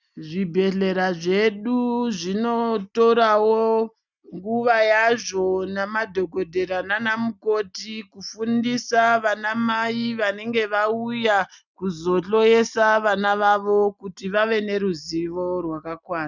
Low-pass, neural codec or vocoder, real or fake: 7.2 kHz; none; real